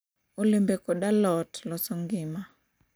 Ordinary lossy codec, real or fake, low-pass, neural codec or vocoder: none; real; none; none